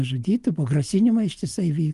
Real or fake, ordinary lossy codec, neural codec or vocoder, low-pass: real; Opus, 32 kbps; none; 10.8 kHz